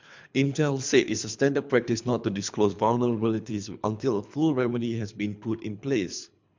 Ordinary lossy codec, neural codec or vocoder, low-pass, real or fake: MP3, 64 kbps; codec, 24 kHz, 3 kbps, HILCodec; 7.2 kHz; fake